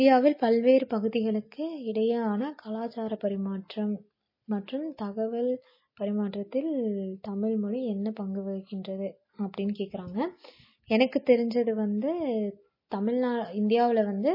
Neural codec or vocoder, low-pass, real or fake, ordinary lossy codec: none; 5.4 kHz; real; MP3, 24 kbps